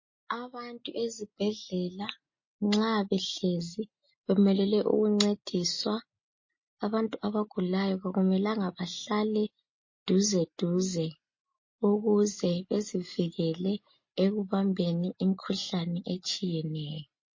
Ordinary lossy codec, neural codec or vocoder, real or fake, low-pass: MP3, 32 kbps; none; real; 7.2 kHz